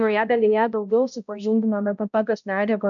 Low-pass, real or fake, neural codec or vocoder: 7.2 kHz; fake; codec, 16 kHz, 0.5 kbps, X-Codec, HuBERT features, trained on balanced general audio